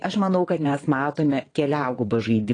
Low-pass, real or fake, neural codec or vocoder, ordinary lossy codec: 9.9 kHz; fake; vocoder, 22.05 kHz, 80 mel bands, WaveNeXt; AAC, 32 kbps